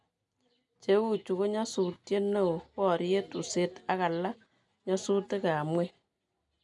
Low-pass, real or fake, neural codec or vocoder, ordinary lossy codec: 10.8 kHz; real; none; none